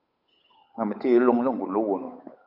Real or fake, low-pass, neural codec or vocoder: fake; 5.4 kHz; codec, 16 kHz, 8 kbps, FunCodec, trained on Chinese and English, 25 frames a second